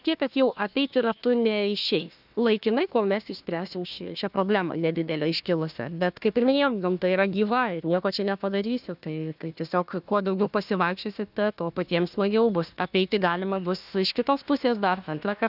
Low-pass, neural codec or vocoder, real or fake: 5.4 kHz; codec, 16 kHz, 1 kbps, FunCodec, trained on Chinese and English, 50 frames a second; fake